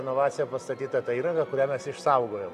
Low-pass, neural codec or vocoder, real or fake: 14.4 kHz; none; real